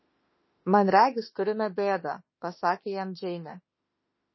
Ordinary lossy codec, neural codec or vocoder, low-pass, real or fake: MP3, 24 kbps; autoencoder, 48 kHz, 32 numbers a frame, DAC-VAE, trained on Japanese speech; 7.2 kHz; fake